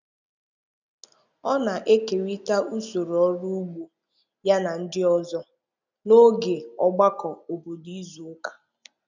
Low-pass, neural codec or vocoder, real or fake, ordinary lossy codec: 7.2 kHz; none; real; none